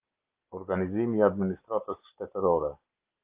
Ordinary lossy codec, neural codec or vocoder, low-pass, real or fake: Opus, 24 kbps; none; 3.6 kHz; real